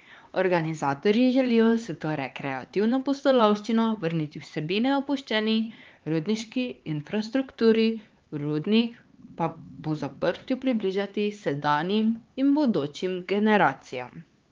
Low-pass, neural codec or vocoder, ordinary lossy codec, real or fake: 7.2 kHz; codec, 16 kHz, 4 kbps, X-Codec, HuBERT features, trained on LibriSpeech; Opus, 32 kbps; fake